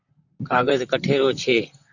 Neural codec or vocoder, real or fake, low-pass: vocoder, 44.1 kHz, 128 mel bands, Pupu-Vocoder; fake; 7.2 kHz